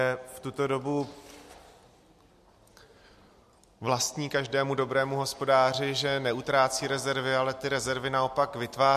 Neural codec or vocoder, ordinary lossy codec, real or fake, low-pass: none; MP3, 64 kbps; real; 14.4 kHz